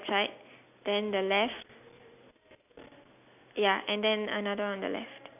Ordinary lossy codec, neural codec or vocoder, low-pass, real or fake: none; none; 3.6 kHz; real